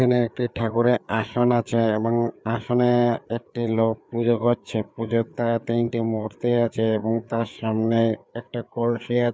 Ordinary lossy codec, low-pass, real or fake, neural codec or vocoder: none; none; fake; codec, 16 kHz, 4 kbps, FreqCodec, larger model